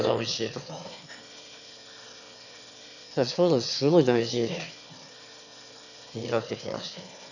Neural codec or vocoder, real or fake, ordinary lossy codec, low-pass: autoencoder, 22.05 kHz, a latent of 192 numbers a frame, VITS, trained on one speaker; fake; none; 7.2 kHz